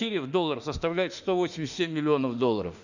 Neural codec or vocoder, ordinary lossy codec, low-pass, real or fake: autoencoder, 48 kHz, 32 numbers a frame, DAC-VAE, trained on Japanese speech; none; 7.2 kHz; fake